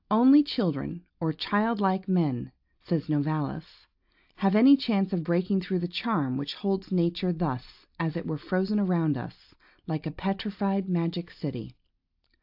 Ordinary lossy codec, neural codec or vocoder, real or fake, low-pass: AAC, 48 kbps; none; real; 5.4 kHz